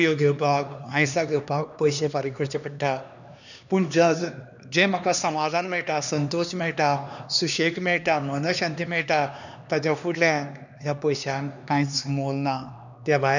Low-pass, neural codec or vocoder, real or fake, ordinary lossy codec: 7.2 kHz; codec, 16 kHz, 2 kbps, X-Codec, HuBERT features, trained on LibriSpeech; fake; none